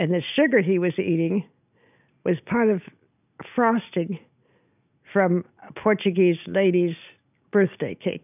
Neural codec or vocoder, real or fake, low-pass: none; real; 3.6 kHz